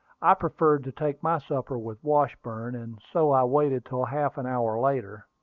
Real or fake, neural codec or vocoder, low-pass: real; none; 7.2 kHz